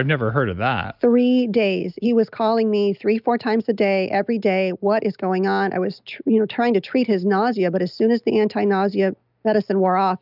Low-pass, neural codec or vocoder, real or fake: 5.4 kHz; none; real